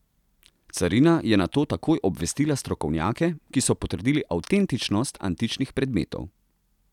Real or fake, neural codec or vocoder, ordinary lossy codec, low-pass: real; none; none; 19.8 kHz